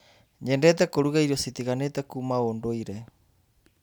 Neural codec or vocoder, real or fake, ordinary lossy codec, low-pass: none; real; none; none